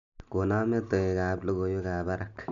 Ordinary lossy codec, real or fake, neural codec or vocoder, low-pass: none; real; none; 7.2 kHz